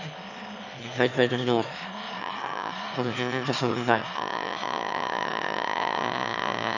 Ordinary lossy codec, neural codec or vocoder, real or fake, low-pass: none; autoencoder, 22.05 kHz, a latent of 192 numbers a frame, VITS, trained on one speaker; fake; 7.2 kHz